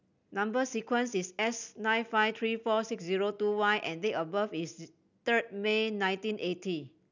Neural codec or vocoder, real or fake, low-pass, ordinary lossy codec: none; real; 7.2 kHz; none